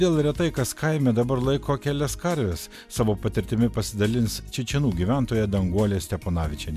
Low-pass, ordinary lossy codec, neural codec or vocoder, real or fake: 14.4 kHz; MP3, 96 kbps; none; real